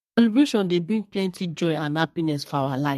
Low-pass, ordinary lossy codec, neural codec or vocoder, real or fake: 14.4 kHz; MP3, 64 kbps; codec, 32 kHz, 1.9 kbps, SNAC; fake